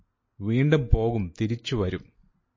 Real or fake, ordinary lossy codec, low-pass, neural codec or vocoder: real; MP3, 32 kbps; 7.2 kHz; none